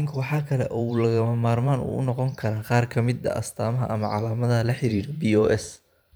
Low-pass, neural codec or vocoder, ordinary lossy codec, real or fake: none; vocoder, 44.1 kHz, 128 mel bands every 512 samples, BigVGAN v2; none; fake